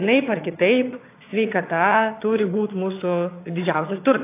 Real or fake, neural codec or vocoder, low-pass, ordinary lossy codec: fake; vocoder, 22.05 kHz, 80 mel bands, HiFi-GAN; 3.6 kHz; AAC, 24 kbps